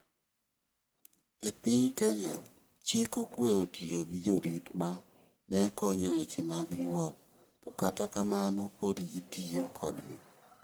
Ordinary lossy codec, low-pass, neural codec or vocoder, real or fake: none; none; codec, 44.1 kHz, 1.7 kbps, Pupu-Codec; fake